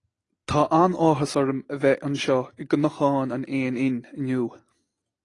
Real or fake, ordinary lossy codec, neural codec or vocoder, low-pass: real; AAC, 32 kbps; none; 10.8 kHz